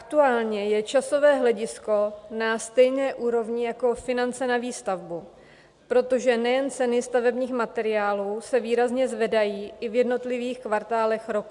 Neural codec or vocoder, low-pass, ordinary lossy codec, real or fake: none; 10.8 kHz; MP3, 96 kbps; real